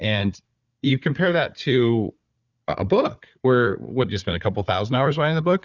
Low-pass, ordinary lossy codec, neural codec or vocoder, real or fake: 7.2 kHz; Opus, 64 kbps; codec, 16 kHz, 4 kbps, FunCodec, trained on Chinese and English, 50 frames a second; fake